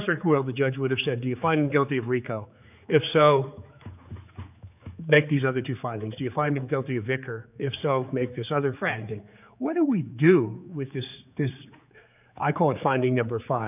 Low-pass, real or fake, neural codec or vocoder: 3.6 kHz; fake; codec, 16 kHz, 4 kbps, X-Codec, HuBERT features, trained on general audio